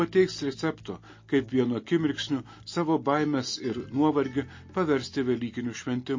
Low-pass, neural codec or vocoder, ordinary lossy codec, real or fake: 7.2 kHz; none; MP3, 32 kbps; real